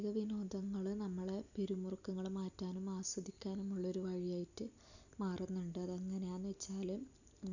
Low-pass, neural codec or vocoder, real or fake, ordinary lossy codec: 7.2 kHz; none; real; none